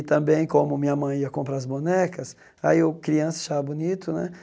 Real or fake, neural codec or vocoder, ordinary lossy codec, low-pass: real; none; none; none